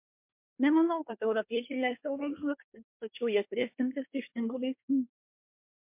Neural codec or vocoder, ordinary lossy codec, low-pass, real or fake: codec, 24 kHz, 1 kbps, SNAC; MP3, 32 kbps; 3.6 kHz; fake